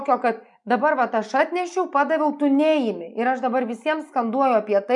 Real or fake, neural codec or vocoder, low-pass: real; none; 10.8 kHz